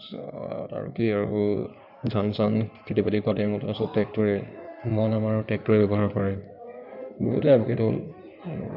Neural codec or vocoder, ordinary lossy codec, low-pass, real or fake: codec, 16 kHz in and 24 kHz out, 2.2 kbps, FireRedTTS-2 codec; none; 5.4 kHz; fake